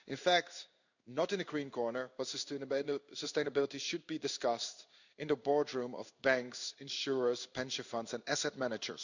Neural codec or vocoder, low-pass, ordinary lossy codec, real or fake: codec, 16 kHz in and 24 kHz out, 1 kbps, XY-Tokenizer; 7.2 kHz; none; fake